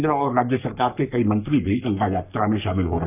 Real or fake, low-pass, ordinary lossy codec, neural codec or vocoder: fake; 3.6 kHz; none; codec, 44.1 kHz, 3.4 kbps, Pupu-Codec